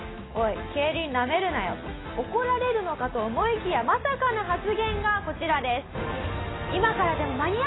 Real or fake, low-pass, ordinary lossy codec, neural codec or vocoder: real; 7.2 kHz; AAC, 16 kbps; none